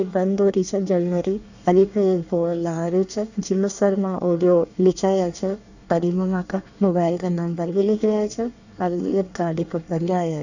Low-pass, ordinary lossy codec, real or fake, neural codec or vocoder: 7.2 kHz; none; fake; codec, 24 kHz, 1 kbps, SNAC